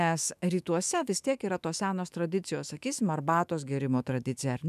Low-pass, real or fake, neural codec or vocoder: 14.4 kHz; fake; autoencoder, 48 kHz, 128 numbers a frame, DAC-VAE, trained on Japanese speech